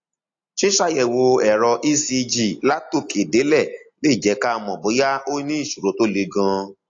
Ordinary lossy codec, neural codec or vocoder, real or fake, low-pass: AAC, 48 kbps; none; real; 7.2 kHz